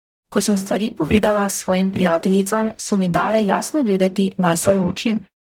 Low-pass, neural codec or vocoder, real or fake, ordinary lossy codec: 19.8 kHz; codec, 44.1 kHz, 0.9 kbps, DAC; fake; none